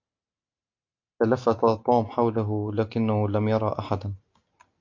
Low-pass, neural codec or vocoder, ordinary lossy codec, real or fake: 7.2 kHz; none; MP3, 48 kbps; real